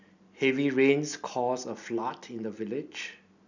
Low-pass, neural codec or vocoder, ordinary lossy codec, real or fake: 7.2 kHz; none; none; real